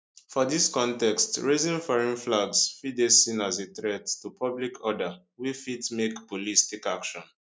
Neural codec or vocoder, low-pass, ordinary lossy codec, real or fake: none; none; none; real